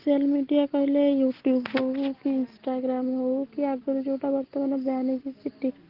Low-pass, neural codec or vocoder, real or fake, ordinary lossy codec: 5.4 kHz; none; real; Opus, 16 kbps